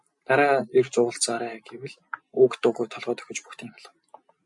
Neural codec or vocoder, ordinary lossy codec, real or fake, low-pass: none; MP3, 64 kbps; real; 10.8 kHz